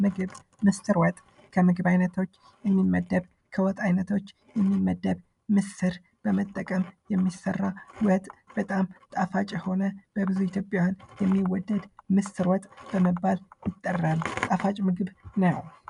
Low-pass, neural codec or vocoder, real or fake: 10.8 kHz; none; real